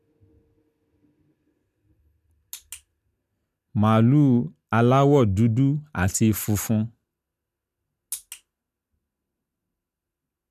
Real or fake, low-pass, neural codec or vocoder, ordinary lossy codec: real; 14.4 kHz; none; none